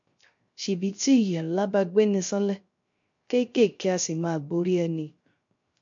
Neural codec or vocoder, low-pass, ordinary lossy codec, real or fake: codec, 16 kHz, 0.3 kbps, FocalCodec; 7.2 kHz; MP3, 48 kbps; fake